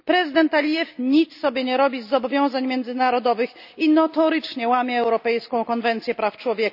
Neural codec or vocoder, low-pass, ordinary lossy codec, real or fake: none; 5.4 kHz; none; real